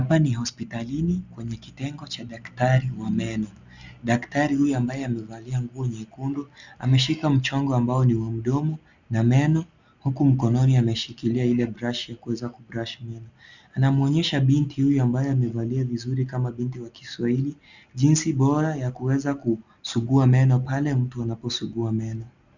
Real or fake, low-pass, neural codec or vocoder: real; 7.2 kHz; none